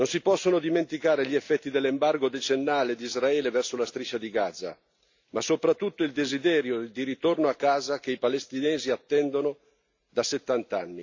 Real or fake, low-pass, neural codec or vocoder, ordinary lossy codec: real; 7.2 kHz; none; AAC, 48 kbps